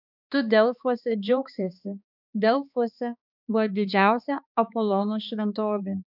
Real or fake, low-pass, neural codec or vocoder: fake; 5.4 kHz; codec, 16 kHz, 2 kbps, X-Codec, HuBERT features, trained on balanced general audio